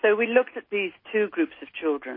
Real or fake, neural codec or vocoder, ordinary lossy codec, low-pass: real; none; MP3, 24 kbps; 5.4 kHz